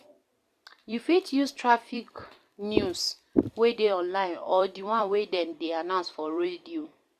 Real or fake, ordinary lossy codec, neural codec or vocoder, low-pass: fake; Opus, 64 kbps; vocoder, 44.1 kHz, 128 mel bands every 256 samples, BigVGAN v2; 14.4 kHz